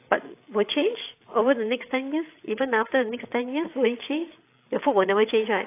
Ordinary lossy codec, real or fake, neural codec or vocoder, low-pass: AAC, 24 kbps; fake; codec, 16 kHz, 8 kbps, FreqCodec, larger model; 3.6 kHz